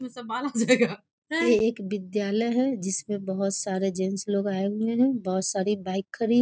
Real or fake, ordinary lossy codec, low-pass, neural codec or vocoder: real; none; none; none